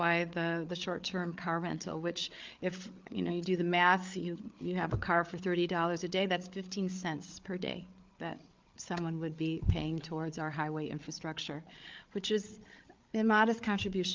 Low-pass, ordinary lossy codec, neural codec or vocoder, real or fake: 7.2 kHz; Opus, 32 kbps; codec, 16 kHz, 8 kbps, FreqCodec, larger model; fake